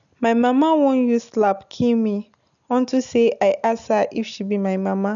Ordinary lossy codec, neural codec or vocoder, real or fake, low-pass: none; none; real; 7.2 kHz